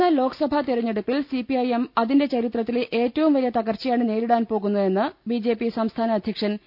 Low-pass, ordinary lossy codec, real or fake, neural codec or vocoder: 5.4 kHz; none; real; none